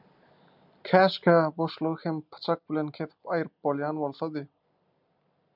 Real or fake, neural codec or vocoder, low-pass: real; none; 5.4 kHz